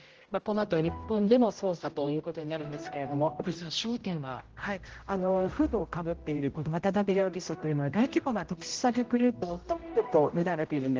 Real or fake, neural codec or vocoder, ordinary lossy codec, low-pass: fake; codec, 16 kHz, 0.5 kbps, X-Codec, HuBERT features, trained on general audio; Opus, 16 kbps; 7.2 kHz